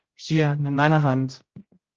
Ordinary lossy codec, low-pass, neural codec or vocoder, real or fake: Opus, 16 kbps; 7.2 kHz; codec, 16 kHz, 0.5 kbps, X-Codec, HuBERT features, trained on general audio; fake